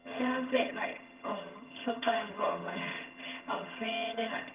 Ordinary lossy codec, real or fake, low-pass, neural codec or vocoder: Opus, 32 kbps; fake; 3.6 kHz; vocoder, 22.05 kHz, 80 mel bands, HiFi-GAN